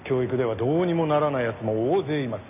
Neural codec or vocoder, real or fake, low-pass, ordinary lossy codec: none; real; 3.6 kHz; none